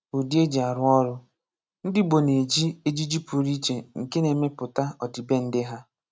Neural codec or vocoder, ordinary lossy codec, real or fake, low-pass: none; none; real; none